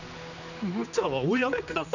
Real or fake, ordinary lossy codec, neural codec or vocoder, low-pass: fake; none; codec, 16 kHz, 2 kbps, X-Codec, HuBERT features, trained on general audio; 7.2 kHz